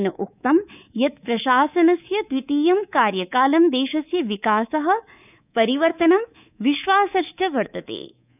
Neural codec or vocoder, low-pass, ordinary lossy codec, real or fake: codec, 24 kHz, 3.1 kbps, DualCodec; 3.6 kHz; none; fake